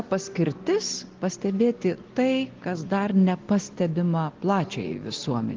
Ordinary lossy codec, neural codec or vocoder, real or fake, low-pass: Opus, 16 kbps; none; real; 7.2 kHz